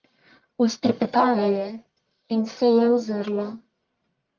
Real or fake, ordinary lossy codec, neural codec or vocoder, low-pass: fake; Opus, 32 kbps; codec, 44.1 kHz, 1.7 kbps, Pupu-Codec; 7.2 kHz